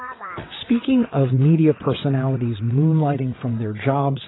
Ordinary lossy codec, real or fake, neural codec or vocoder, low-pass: AAC, 16 kbps; fake; vocoder, 22.05 kHz, 80 mel bands, WaveNeXt; 7.2 kHz